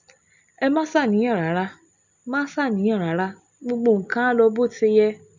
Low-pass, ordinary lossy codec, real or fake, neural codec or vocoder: 7.2 kHz; none; real; none